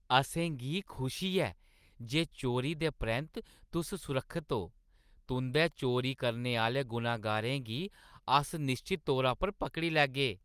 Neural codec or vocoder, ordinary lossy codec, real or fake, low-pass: none; Opus, 32 kbps; real; 14.4 kHz